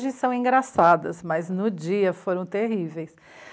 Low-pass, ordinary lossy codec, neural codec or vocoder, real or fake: none; none; none; real